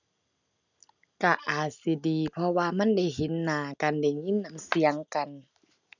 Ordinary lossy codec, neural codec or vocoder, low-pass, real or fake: none; none; 7.2 kHz; real